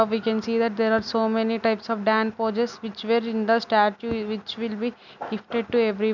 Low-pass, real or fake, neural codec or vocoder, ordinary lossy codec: 7.2 kHz; real; none; none